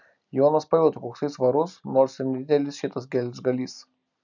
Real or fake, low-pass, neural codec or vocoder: real; 7.2 kHz; none